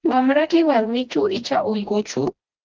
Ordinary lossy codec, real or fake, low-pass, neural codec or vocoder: Opus, 32 kbps; fake; 7.2 kHz; codec, 16 kHz, 1 kbps, FreqCodec, smaller model